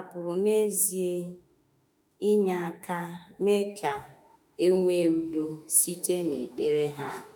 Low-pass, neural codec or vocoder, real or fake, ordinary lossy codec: none; autoencoder, 48 kHz, 32 numbers a frame, DAC-VAE, trained on Japanese speech; fake; none